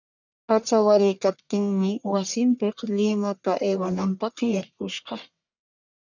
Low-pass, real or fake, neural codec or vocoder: 7.2 kHz; fake; codec, 44.1 kHz, 1.7 kbps, Pupu-Codec